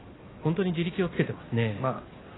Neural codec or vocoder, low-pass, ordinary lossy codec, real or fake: none; 7.2 kHz; AAC, 16 kbps; real